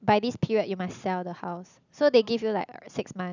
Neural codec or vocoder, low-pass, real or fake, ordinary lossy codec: none; 7.2 kHz; real; none